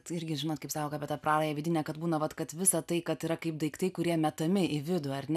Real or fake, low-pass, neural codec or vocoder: real; 14.4 kHz; none